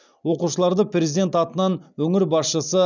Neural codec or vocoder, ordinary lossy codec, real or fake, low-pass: none; none; real; 7.2 kHz